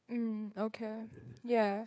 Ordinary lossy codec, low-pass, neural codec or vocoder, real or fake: none; none; codec, 16 kHz, 4 kbps, FreqCodec, larger model; fake